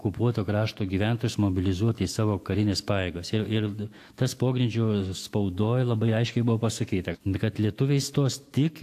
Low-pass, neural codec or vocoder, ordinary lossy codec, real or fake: 14.4 kHz; autoencoder, 48 kHz, 128 numbers a frame, DAC-VAE, trained on Japanese speech; AAC, 64 kbps; fake